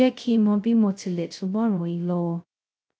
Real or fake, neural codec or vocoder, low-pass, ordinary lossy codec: fake; codec, 16 kHz, 0.2 kbps, FocalCodec; none; none